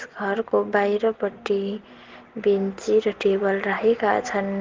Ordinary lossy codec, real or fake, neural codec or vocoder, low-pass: Opus, 16 kbps; real; none; 7.2 kHz